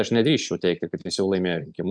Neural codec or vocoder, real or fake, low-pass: none; real; 9.9 kHz